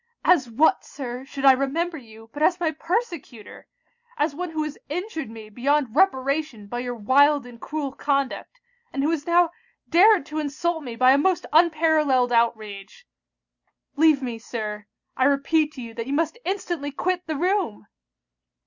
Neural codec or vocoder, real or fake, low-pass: none; real; 7.2 kHz